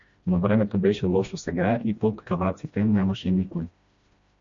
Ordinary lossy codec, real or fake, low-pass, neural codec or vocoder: MP3, 48 kbps; fake; 7.2 kHz; codec, 16 kHz, 1 kbps, FreqCodec, smaller model